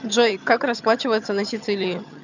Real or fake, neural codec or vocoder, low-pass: fake; vocoder, 22.05 kHz, 80 mel bands, HiFi-GAN; 7.2 kHz